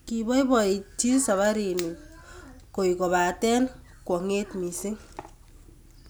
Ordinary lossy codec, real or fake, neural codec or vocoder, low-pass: none; real; none; none